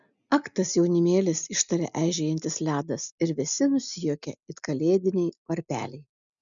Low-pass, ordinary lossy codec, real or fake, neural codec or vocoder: 7.2 kHz; MP3, 96 kbps; real; none